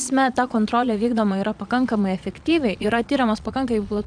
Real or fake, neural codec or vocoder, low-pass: fake; vocoder, 22.05 kHz, 80 mel bands, Vocos; 9.9 kHz